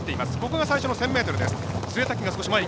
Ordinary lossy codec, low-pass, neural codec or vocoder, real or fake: none; none; none; real